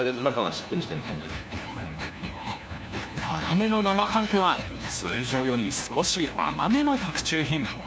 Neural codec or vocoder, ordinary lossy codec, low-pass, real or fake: codec, 16 kHz, 1 kbps, FunCodec, trained on LibriTTS, 50 frames a second; none; none; fake